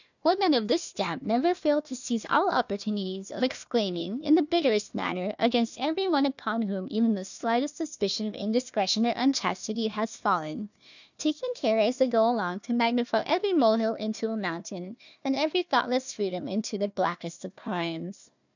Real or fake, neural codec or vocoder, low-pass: fake; codec, 16 kHz, 1 kbps, FunCodec, trained on Chinese and English, 50 frames a second; 7.2 kHz